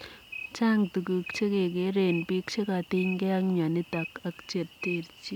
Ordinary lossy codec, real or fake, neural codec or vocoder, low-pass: none; real; none; 19.8 kHz